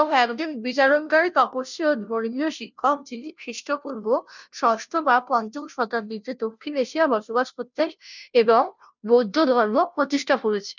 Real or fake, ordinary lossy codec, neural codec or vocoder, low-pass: fake; none; codec, 16 kHz, 0.5 kbps, FunCodec, trained on Chinese and English, 25 frames a second; 7.2 kHz